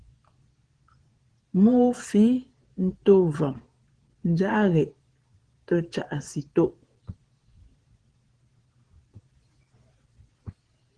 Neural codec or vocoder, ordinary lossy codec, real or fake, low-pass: vocoder, 22.05 kHz, 80 mel bands, Vocos; Opus, 16 kbps; fake; 9.9 kHz